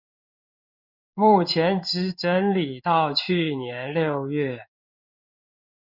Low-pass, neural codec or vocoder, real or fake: 5.4 kHz; codec, 16 kHz in and 24 kHz out, 1 kbps, XY-Tokenizer; fake